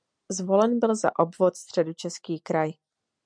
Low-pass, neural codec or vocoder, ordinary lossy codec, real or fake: 9.9 kHz; none; MP3, 64 kbps; real